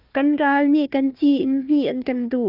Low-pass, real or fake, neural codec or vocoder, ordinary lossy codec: 5.4 kHz; fake; codec, 16 kHz, 1 kbps, FunCodec, trained on Chinese and English, 50 frames a second; Opus, 24 kbps